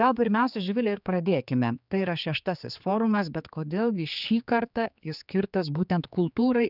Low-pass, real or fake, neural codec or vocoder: 5.4 kHz; fake; codec, 16 kHz, 4 kbps, X-Codec, HuBERT features, trained on general audio